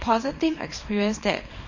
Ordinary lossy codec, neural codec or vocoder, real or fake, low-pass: MP3, 32 kbps; codec, 24 kHz, 0.9 kbps, WavTokenizer, small release; fake; 7.2 kHz